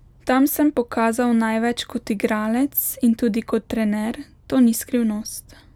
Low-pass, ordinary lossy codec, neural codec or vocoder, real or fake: 19.8 kHz; none; none; real